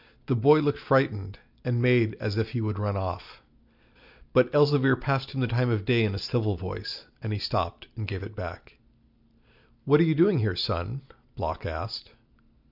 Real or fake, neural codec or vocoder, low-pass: real; none; 5.4 kHz